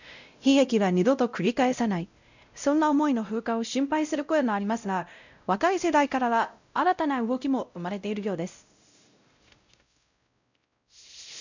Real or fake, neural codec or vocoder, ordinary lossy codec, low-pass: fake; codec, 16 kHz, 0.5 kbps, X-Codec, WavLM features, trained on Multilingual LibriSpeech; none; 7.2 kHz